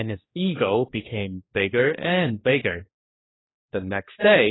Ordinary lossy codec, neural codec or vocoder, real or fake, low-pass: AAC, 16 kbps; codec, 16 kHz, 2 kbps, X-Codec, HuBERT features, trained on general audio; fake; 7.2 kHz